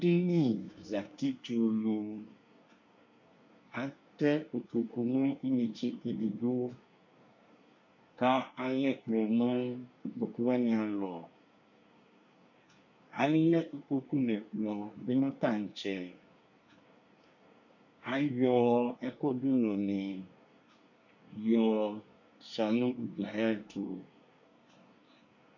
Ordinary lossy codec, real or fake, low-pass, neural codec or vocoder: MP3, 64 kbps; fake; 7.2 kHz; codec, 24 kHz, 1 kbps, SNAC